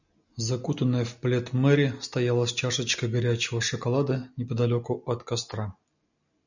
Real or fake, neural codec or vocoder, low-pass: real; none; 7.2 kHz